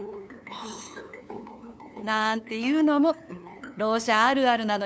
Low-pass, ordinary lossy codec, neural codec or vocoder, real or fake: none; none; codec, 16 kHz, 2 kbps, FunCodec, trained on LibriTTS, 25 frames a second; fake